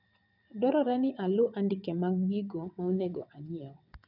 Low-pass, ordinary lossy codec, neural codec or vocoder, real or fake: 5.4 kHz; none; vocoder, 22.05 kHz, 80 mel bands, WaveNeXt; fake